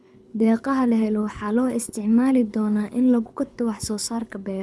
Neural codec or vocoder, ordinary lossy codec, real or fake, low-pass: codec, 24 kHz, 6 kbps, HILCodec; none; fake; none